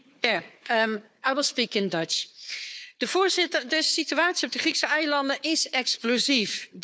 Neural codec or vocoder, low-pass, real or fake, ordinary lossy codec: codec, 16 kHz, 4 kbps, FunCodec, trained on Chinese and English, 50 frames a second; none; fake; none